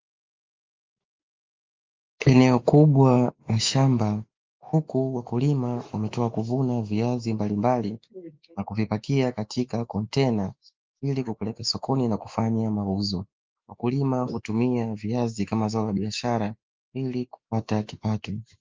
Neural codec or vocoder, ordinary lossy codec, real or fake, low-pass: autoencoder, 48 kHz, 128 numbers a frame, DAC-VAE, trained on Japanese speech; Opus, 24 kbps; fake; 7.2 kHz